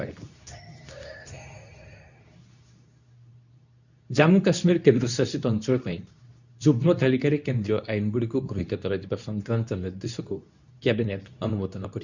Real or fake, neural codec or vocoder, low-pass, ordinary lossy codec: fake; codec, 24 kHz, 0.9 kbps, WavTokenizer, medium speech release version 2; 7.2 kHz; none